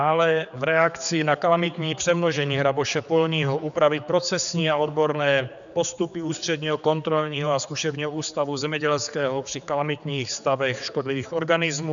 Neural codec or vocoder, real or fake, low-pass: codec, 16 kHz, 4 kbps, X-Codec, HuBERT features, trained on general audio; fake; 7.2 kHz